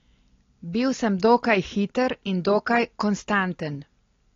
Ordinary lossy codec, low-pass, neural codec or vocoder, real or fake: AAC, 32 kbps; 7.2 kHz; none; real